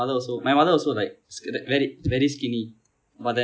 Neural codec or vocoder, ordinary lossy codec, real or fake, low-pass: none; none; real; none